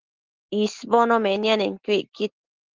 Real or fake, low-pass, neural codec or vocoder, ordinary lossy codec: real; 7.2 kHz; none; Opus, 16 kbps